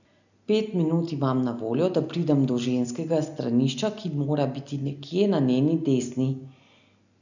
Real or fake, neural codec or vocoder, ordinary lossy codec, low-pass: real; none; none; 7.2 kHz